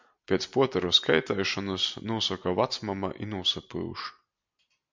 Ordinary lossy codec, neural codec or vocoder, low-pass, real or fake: MP3, 64 kbps; none; 7.2 kHz; real